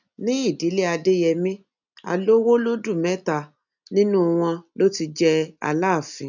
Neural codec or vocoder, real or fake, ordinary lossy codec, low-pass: none; real; none; 7.2 kHz